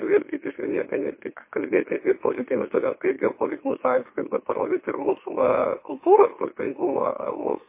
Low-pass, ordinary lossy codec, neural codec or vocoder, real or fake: 3.6 kHz; MP3, 24 kbps; autoencoder, 44.1 kHz, a latent of 192 numbers a frame, MeloTTS; fake